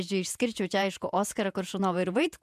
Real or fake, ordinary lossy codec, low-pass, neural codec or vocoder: fake; MP3, 96 kbps; 14.4 kHz; vocoder, 48 kHz, 128 mel bands, Vocos